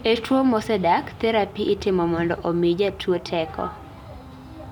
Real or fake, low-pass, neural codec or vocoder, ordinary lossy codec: real; 19.8 kHz; none; none